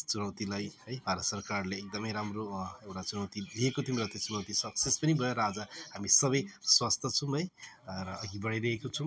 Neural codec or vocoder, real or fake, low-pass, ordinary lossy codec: none; real; none; none